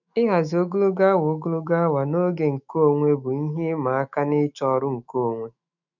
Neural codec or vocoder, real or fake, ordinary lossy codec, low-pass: autoencoder, 48 kHz, 128 numbers a frame, DAC-VAE, trained on Japanese speech; fake; none; 7.2 kHz